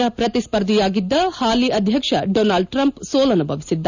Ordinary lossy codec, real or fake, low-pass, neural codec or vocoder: none; real; 7.2 kHz; none